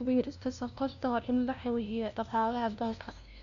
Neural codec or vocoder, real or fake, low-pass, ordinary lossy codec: codec, 16 kHz, 0.5 kbps, FunCodec, trained on LibriTTS, 25 frames a second; fake; 7.2 kHz; none